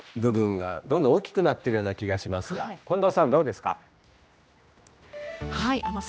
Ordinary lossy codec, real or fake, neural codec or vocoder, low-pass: none; fake; codec, 16 kHz, 1 kbps, X-Codec, HuBERT features, trained on general audio; none